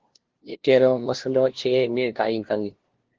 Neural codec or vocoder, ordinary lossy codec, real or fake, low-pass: codec, 16 kHz, 0.5 kbps, FunCodec, trained on LibriTTS, 25 frames a second; Opus, 16 kbps; fake; 7.2 kHz